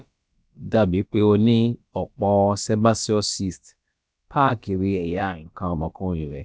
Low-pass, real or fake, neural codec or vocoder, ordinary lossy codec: none; fake; codec, 16 kHz, about 1 kbps, DyCAST, with the encoder's durations; none